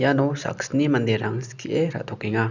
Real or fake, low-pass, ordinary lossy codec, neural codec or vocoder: real; 7.2 kHz; none; none